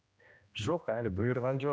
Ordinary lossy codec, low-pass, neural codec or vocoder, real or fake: none; none; codec, 16 kHz, 1 kbps, X-Codec, HuBERT features, trained on general audio; fake